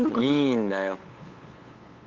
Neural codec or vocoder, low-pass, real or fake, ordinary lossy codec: codec, 16 kHz, 8 kbps, FunCodec, trained on LibriTTS, 25 frames a second; 7.2 kHz; fake; Opus, 24 kbps